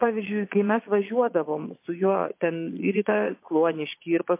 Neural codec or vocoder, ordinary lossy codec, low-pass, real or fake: vocoder, 22.05 kHz, 80 mel bands, Vocos; MP3, 24 kbps; 3.6 kHz; fake